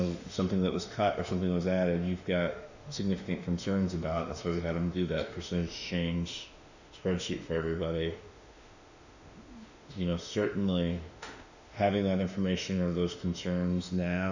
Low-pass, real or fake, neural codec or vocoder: 7.2 kHz; fake; autoencoder, 48 kHz, 32 numbers a frame, DAC-VAE, trained on Japanese speech